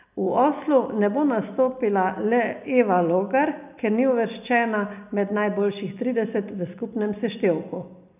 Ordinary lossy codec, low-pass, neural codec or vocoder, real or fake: none; 3.6 kHz; none; real